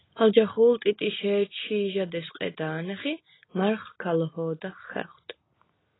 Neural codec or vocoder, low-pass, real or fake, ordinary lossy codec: none; 7.2 kHz; real; AAC, 16 kbps